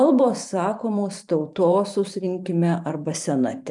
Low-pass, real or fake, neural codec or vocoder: 10.8 kHz; fake; vocoder, 44.1 kHz, 128 mel bands every 512 samples, BigVGAN v2